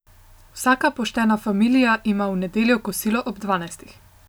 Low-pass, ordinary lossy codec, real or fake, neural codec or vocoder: none; none; real; none